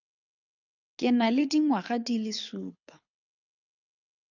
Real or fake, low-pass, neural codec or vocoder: fake; 7.2 kHz; codec, 24 kHz, 6 kbps, HILCodec